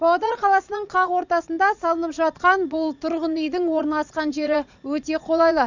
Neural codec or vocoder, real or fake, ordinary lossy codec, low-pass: vocoder, 44.1 kHz, 80 mel bands, Vocos; fake; none; 7.2 kHz